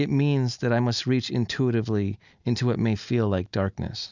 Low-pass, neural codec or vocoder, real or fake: 7.2 kHz; none; real